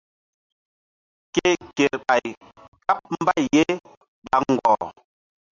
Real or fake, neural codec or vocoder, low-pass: real; none; 7.2 kHz